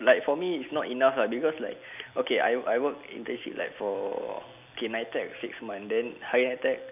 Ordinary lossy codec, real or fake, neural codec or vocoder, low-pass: none; real; none; 3.6 kHz